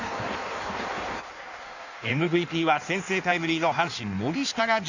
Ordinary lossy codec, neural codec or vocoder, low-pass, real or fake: none; codec, 16 kHz in and 24 kHz out, 1.1 kbps, FireRedTTS-2 codec; 7.2 kHz; fake